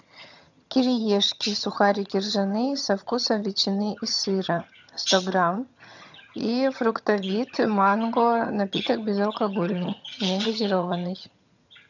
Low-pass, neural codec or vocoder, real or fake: 7.2 kHz; vocoder, 22.05 kHz, 80 mel bands, HiFi-GAN; fake